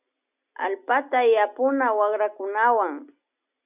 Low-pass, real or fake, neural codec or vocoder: 3.6 kHz; real; none